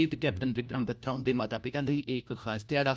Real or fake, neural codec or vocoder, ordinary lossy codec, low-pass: fake; codec, 16 kHz, 1 kbps, FunCodec, trained on LibriTTS, 50 frames a second; none; none